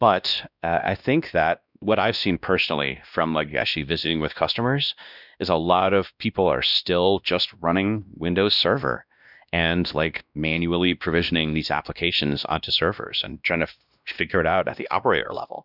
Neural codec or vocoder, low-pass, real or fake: codec, 16 kHz, 1 kbps, X-Codec, WavLM features, trained on Multilingual LibriSpeech; 5.4 kHz; fake